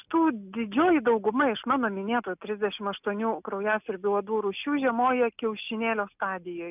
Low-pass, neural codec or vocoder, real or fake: 3.6 kHz; none; real